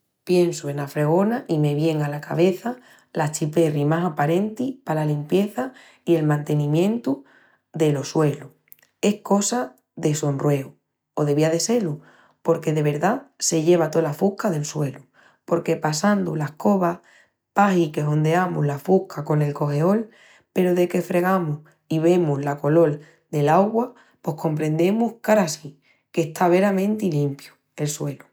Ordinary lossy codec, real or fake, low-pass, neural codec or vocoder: none; real; none; none